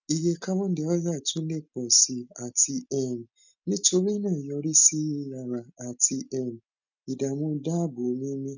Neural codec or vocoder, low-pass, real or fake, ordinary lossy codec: none; 7.2 kHz; real; none